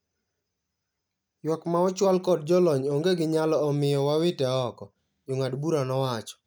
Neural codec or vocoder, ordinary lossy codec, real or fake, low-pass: none; none; real; none